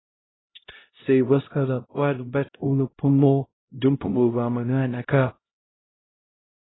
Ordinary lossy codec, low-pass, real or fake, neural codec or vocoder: AAC, 16 kbps; 7.2 kHz; fake; codec, 16 kHz, 0.5 kbps, X-Codec, HuBERT features, trained on LibriSpeech